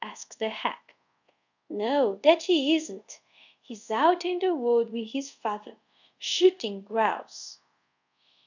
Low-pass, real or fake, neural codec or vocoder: 7.2 kHz; fake; codec, 24 kHz, 0.5 kbps, DualCodec